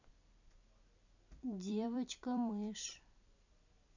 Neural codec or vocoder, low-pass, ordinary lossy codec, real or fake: vocoder, 44.1 kHz, 128 mel bands every 256 samples, BigVGAN v2; 7.2 kHz; none; fake